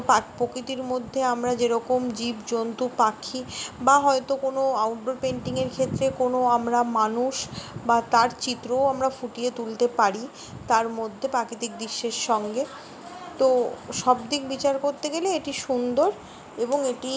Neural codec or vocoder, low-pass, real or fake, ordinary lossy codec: none; none; real; none